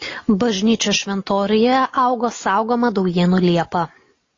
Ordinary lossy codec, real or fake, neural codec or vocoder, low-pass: AAC, 32 kbps; real; none; 7.2 kHz